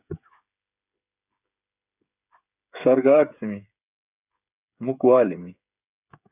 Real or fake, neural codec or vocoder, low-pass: fake; codec, 16 kHz, 8 kbps, FreqCodec, smaller model; 3.6 kHz